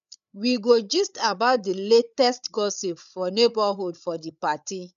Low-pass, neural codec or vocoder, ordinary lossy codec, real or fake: 7.2 kHz; codec, 16 kHz, 8 kbps, FreqCodec, larger model; MP3, 96 kbps; fake